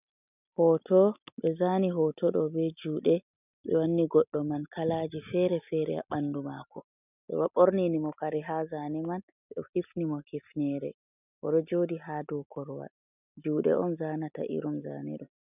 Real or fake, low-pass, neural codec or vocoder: real; 3.6 kHz; none